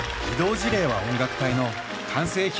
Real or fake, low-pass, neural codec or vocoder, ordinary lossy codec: real; none; none; none